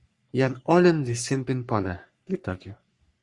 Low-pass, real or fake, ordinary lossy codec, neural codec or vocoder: 10.8 kHz; fake; Opus, 64 kbps; codec, 44.1 kHz, 3.4 kbps, Pupu-Codec